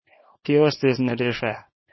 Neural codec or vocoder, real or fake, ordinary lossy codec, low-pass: codec, 24 kHz, 0.9 kbps, WavTokenizer, small release; fake; MP3, 24 kbps; 7.2 kHz